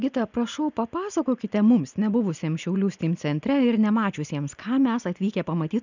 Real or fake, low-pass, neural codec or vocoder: real; 7.2 kHz; none